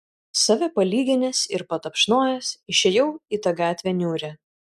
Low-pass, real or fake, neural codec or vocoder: 14.4 kHz; real; none